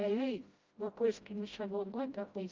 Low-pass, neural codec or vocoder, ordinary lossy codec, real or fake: 7.2 kHz; codec, 16 kHz, 0.5 kbps, FreqCodec, smaller model; Opus, 32 kbps; fake